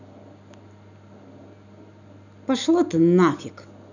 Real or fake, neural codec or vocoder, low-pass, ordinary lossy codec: real; none; 7.2 kHz; none